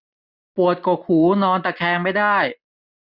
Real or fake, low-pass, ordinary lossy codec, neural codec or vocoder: real; 5.4 kHz; none; none